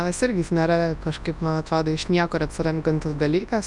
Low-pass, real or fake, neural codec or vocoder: 10.8 kHz; fake; codec, 24 kHz, 0.9 kbps, WavTokenizer, large speech release